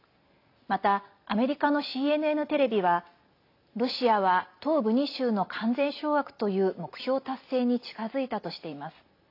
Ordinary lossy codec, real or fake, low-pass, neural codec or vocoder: AAC, 32 kbps; real; 5.4 kHz; none